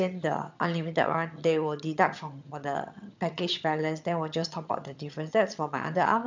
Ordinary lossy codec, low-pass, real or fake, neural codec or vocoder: MP3, 64 kbps; 7.2 kHz; fake; vocoder, 22.05 kHz, 80 mel bands, HiFi-GAN